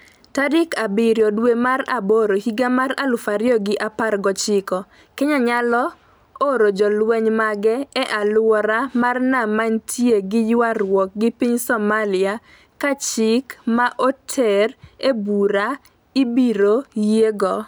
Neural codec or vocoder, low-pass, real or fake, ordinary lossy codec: none; none; real; none